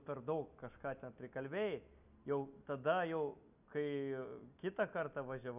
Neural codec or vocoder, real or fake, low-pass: none; real; 3.6 kHz